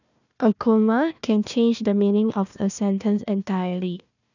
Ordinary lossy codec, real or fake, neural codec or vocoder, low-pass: none; fake; codec, 16 kHz, 1 kbps, FunCodec, trained on Chinese and English, 50 frames a second; 7.2 kHz